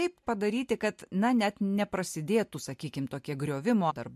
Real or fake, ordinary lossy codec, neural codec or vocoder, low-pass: real; MP3, 64 kbps; none; 14.4 kHz